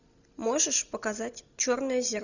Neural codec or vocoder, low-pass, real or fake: none; 7.2 kHz; real